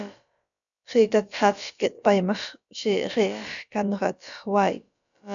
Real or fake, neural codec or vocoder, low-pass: fake; codec, 16 kHz, about 1 kbps, DyCAST, with the encoder's durations; 7.2 kHz